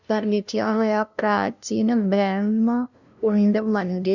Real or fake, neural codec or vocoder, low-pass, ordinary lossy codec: fake; codec, 16 kHz, 0.5 kbps, FunCodec, trained on LibriTTS, 25 frames a second; 7.2 kHz; none